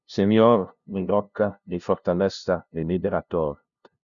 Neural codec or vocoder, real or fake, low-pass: codec, 16 kHz, 0.5 kbps, FunCodec, trained on LibriTTS, 25 frames a second; fake; 7.2 kHz